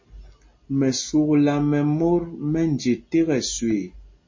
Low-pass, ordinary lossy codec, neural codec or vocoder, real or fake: 7.2 kHz; MP3, 32 kbps; none; real